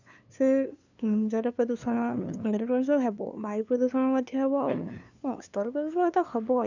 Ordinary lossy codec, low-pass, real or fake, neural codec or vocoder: none; 7.2 kHz; fake; codec, 16 kHz, 2 kbps, FunCodec, trained on LibriTTS, 25 frames a second